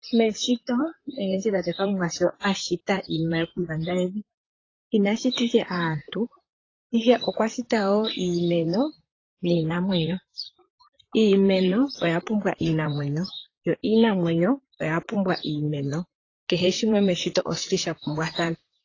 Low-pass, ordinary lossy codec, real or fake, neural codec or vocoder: 7.2 kHz; AAC, 32 kbps; fake; vocoder, 44.1 kHz, 128 mel bands, Pupu-Vocoder